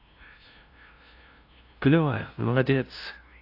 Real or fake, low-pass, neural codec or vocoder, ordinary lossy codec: fake; 5.4 kHz; codec, 16 kHz, 0.5 kbps, FunCodec, trained on LibriTTS, 25 frames a second; AAC, 32 kbps